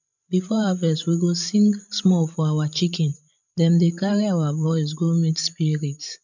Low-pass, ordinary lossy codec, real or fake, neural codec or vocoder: 7.2 kHz; none; fake; codec, 16 kHz, 16 kbps, FreqCodec, larger model